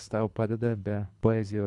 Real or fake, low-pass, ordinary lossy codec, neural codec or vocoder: fake; 10.8 kHz; MP3, 96 kbps; codec, 24 kHz, 3 kbps, HILCodec